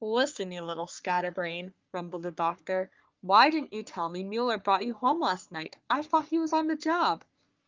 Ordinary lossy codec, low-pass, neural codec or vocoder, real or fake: Opus, 24 kbps; 7.2 kHz; codec, 44.1 kHz, 3.4 kbps, Pupu-Codec; fake